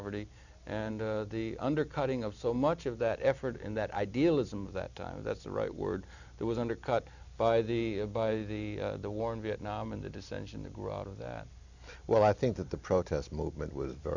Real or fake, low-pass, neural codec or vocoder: real; 7.2 kHz; none